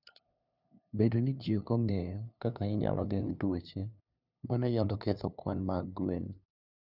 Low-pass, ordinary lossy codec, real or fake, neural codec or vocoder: 5.4 kHz; none; fake; codec, 16 kHz, 2 kbps, FunCodec, trained on LibriTTS, 25 frames a second